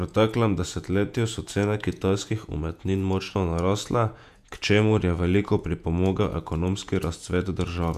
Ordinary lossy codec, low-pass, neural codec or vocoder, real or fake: none; 14.4 kHz; vocoder, 48 kHz, 128 mel bands, Vocos; fake